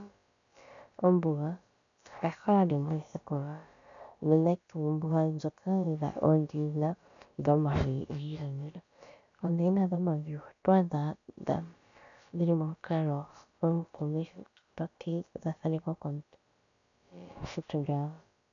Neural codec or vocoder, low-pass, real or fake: codec, 16 kHz, about 1 kbps, DyCAST, with the encoder's durations; 7.2 kHz; fake